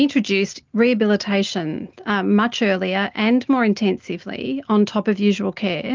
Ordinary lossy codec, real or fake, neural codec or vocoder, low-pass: Opus, 24 kbps; real; none; 7.2 kHz